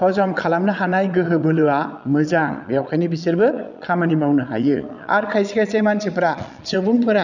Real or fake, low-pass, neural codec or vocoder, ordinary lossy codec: fake; 7.2 kHz; vocoder, 22.05 kHz, 80 mel bands, Vocos; none